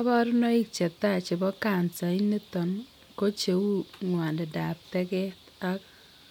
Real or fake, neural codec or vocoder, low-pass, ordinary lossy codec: real; none; 19.8 kHz; MP3, 96 kbps